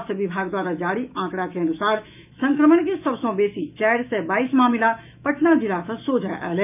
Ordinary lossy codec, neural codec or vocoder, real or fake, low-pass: none; autoencoder, 48 kHz, 128 numbers a frame, DAC-VAE, trained on Japanese speech; fake; 3.6 kHz